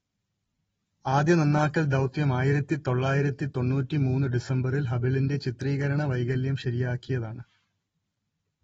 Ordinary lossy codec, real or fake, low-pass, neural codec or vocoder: AAC, 24 kbps; real; 14.4 kHz; none